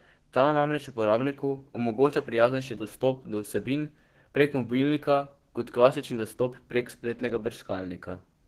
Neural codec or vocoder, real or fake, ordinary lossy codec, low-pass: codec, 32 kHz, 1.9 kbps, SNAC; fake; Opus, 16 kbps; 14.4 kHz